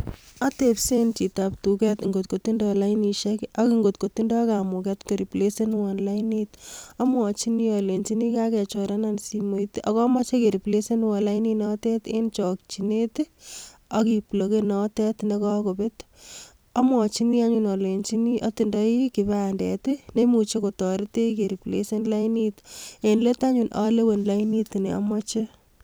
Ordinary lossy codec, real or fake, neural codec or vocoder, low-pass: none; fake; vocoder, 44.1 kHz, 128 mel bands every 256 samples, BigVGAN v2; none